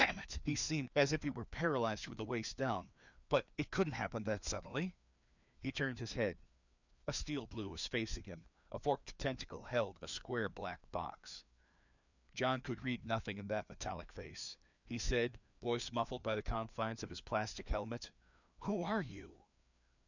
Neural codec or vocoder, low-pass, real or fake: codec, 16 kHz, 2 kbps, FreqCodec, larger model; 7.2 kHz; fake